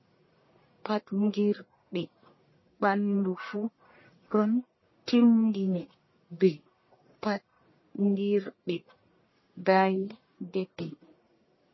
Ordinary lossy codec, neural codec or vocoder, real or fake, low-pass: MP3, 24 kbps; codec, 44.1 kHz, 1.7 kbps, Pupu-Codec; fake; 7.2 kHz